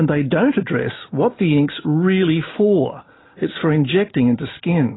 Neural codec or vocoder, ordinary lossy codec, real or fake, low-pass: none; AAC, 16 kbps; real; 7.2 kHz